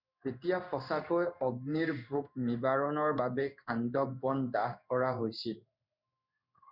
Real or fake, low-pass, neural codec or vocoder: fake; 5.4 kHz; codec, 16 kHz in and 24 kHz out, 1 kbps, XY-Tokenizer